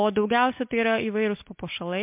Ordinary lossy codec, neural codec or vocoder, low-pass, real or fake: MP3, 32 kbps; none; 3.6 kHz; real